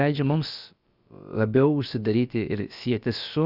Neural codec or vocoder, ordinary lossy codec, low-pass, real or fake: codec, 16 kHz, about 1 kbps, DyCAST, with the encoder's durations; Opus, 64 kbps; 5.4 kHz; fake